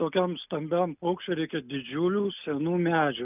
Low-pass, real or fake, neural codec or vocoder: 3.6 kHz; real; none